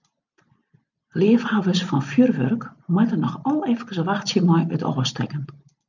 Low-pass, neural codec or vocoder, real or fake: 7.2 kHz; none; real